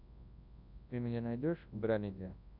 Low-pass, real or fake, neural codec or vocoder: 5.4 kHz; fake; codec, 24 kHz, 0.9 kbps, WavTokenizer, large speech release